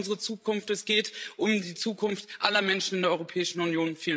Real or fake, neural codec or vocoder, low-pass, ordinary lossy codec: fake; codec, 16 kHz, 16 kbps, FreqCodec, larger model; none; none